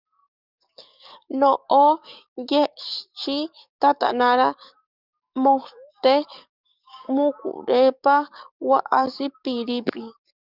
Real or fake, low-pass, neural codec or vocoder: fake; 5.4 kHz; codec, 44.1 kHz, 7.8 kbps, DAC